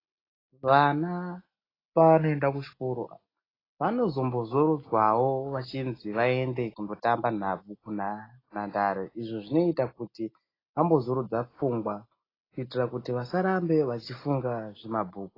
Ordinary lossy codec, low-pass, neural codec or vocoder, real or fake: AAC, 24 kbps; 5.4 kHz; none; real